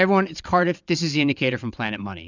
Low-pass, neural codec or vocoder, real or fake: 7.2 kHz; vocoder, 22.05 kHz, 80 mel bands, WaveNeXt; fake